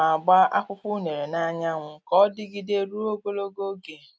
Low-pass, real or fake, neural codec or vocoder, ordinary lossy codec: none; real; none; none